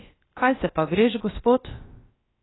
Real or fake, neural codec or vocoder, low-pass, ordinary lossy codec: fake; codec, 16 kHz, about 1 kbps, DyCAST, with the encoder's durations; 7.2 kHz; AAC, 16 kbps